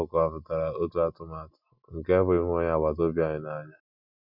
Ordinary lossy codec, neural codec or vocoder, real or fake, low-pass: none; none; real; 5.4 kHz